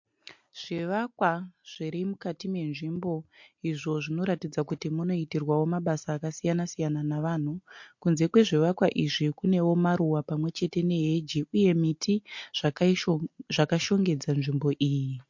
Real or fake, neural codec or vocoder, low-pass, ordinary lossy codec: real; none; 7.2 kHz; MP3, 48 kbps